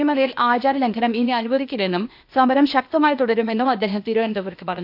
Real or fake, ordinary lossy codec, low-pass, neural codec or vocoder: fake; none; 5.4 kHz; codec, 16 kHz, 0.8 kbps, ZipCodec